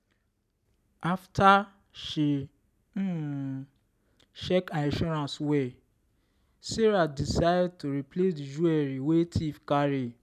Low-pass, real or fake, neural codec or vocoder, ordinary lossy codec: 14.4 kHz; real; none; none